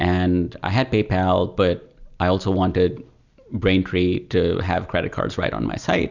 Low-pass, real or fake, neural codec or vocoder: 7.2 kHz; real; none